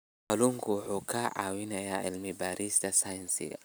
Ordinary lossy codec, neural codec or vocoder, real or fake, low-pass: none; none; real; none